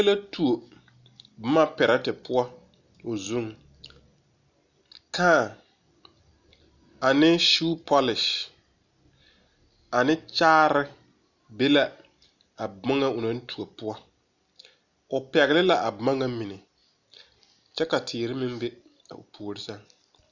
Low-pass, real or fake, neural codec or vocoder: 7.2 kHz; real; none